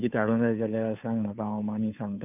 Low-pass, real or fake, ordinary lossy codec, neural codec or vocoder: 3.6 kHz; fake; none; codec, 16 kHz, 2 kbps, FunCodec, trained on Chinese and English, 25 frames a second